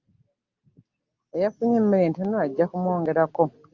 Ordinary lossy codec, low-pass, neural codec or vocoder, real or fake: Opus, 24 kbps; 7.2 kHz; none; real